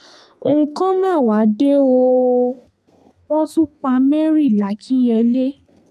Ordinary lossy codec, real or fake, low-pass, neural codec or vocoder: none; fake; 14.4 kHz; codec, 32 kHz, 1.9 kbps, SNAC